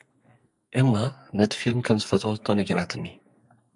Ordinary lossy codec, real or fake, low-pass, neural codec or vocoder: MP3, 96 kbps; fake; 10.8 kHz; codec, 44.1 kHz, 2.6 kbps, SNAC